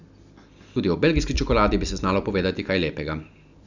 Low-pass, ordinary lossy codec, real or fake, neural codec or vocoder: 7.2 kHz; none; real; none